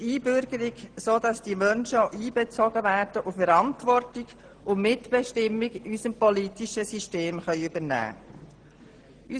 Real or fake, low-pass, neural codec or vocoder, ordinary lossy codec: real; 9.9 kHz; none; Opus, 16 kbps